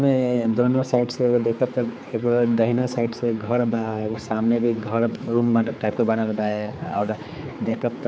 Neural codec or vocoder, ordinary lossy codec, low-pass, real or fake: codec, 16 kHz, 4 kbps, X-Codec, HuBERT features, trained on general audio; none; none; fake